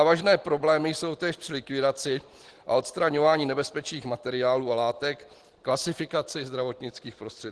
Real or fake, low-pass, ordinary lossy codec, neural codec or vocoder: real; 10.8 kHz; Opus, 16 kbps; none